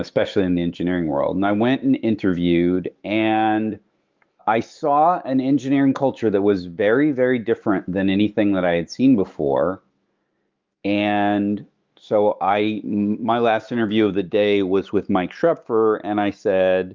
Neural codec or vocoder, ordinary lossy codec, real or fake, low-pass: autoencoder, 48 kHz, 128 numbers a frame, DAC-VAE, trained on Japanese speech; Opus, 32 kbps; fake; 7.2 kHz